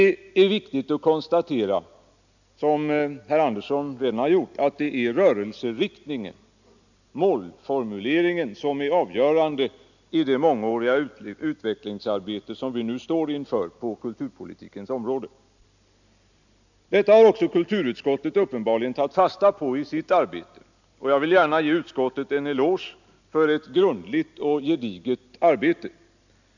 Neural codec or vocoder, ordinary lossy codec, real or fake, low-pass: none; none; real; 7.2 kHz